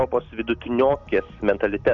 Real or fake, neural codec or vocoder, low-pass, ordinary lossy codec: real; none; 7.2 kHz; Opus, 64 kbps